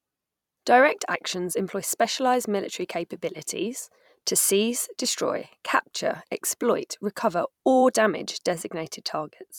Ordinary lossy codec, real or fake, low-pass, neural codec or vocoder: none; fake; 19.8 kHz; vocoder, 44.1 kHz, 128 mel bands every 256 samples, BigVGAN v2